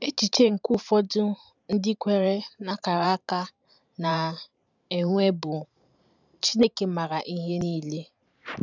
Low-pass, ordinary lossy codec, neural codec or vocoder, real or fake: 7.2 kHz; none; vocoder, 44.1 kHz, 128 mel bands every 512 samples, BigVGAN v2; fake